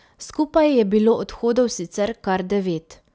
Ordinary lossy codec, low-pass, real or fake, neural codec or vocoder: none; none; real; none